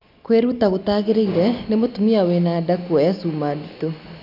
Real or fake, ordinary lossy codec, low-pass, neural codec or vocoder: real; none; 5.4 kHz; none